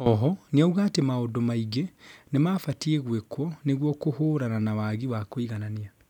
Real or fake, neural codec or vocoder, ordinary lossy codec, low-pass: real; none; none; 19.8 kHz